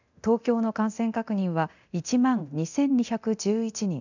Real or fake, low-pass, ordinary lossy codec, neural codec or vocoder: fake; 7.2 kHz; none; codec, 24 kHz, 0.9 kbps, DualCodec